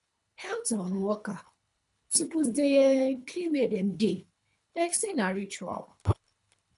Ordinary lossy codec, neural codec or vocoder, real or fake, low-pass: none; codec, 24 kHz, 3 kbps, HILCodec; fake; 10.8 kHz